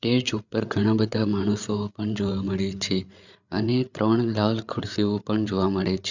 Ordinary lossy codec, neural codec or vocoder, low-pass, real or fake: none; codec, 16 kHz, 8 kbps, FreqCodec, larger model; 7.2 kHz; fake